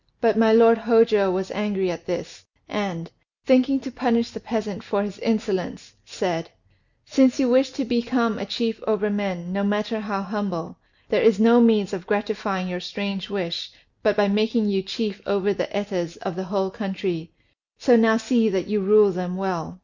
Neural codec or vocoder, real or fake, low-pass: none; real; 7.2 kHz